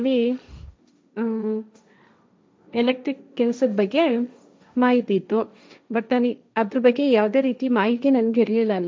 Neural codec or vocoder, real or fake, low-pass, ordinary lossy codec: codec, 16 kHz, 1.1 kbps, Voila-Tokenizer; fake; none; none